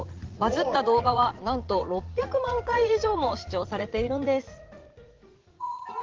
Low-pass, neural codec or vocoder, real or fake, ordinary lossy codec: 7.2 kHz; vocoder, 44.1 kHz, 80 mel bands, Vocos; fake; Opus, 16 kbps